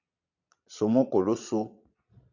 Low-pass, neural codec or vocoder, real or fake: 7.2 kHz; vocoder, 22.05 kHz, 80 mel bands, Vocos; fake